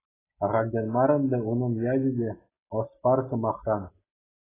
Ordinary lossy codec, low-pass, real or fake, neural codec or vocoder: AAC, 16 kbps; 3.6 kHz; real; none